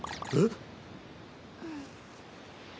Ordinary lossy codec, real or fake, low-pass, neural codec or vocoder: none; real; none; none